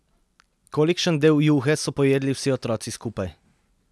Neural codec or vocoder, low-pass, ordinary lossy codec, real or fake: none; none; none; real